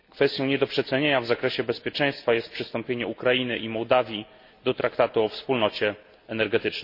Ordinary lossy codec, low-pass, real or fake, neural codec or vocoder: MP3, 32 kbps; 5.4 kHz; real; none